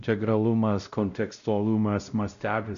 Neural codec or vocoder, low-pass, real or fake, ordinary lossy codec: codec, 16 kHz, 0.5 kbps, X-Codec, WavLM features, trained on Multilingual LibriSpeech; 7.2 kHz; fake; MP3, 96 kbps